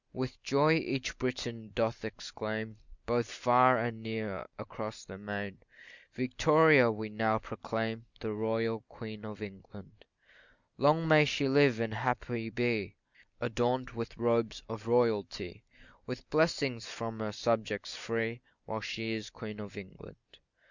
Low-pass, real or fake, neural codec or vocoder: 7.2 kHz; real; none